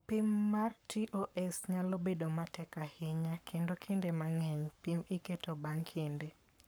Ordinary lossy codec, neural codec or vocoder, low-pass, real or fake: none; codec, 44.1 kHz, 7.8 kbps, Pupu-Codec; none; fake